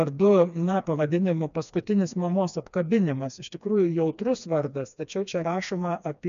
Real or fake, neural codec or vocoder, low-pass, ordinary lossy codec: fake; codec, 16 kHz, 2 kbps, FreqCodec, smaller model; 7.2 kHz; MP3, 96 kbps